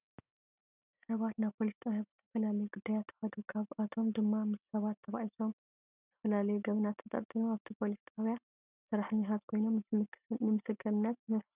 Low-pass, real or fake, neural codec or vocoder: 3.6 kHz; real; none